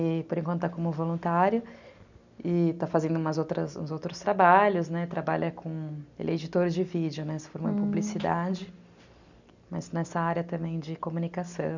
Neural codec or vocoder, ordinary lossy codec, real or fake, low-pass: none; none; real; 7.2 kHz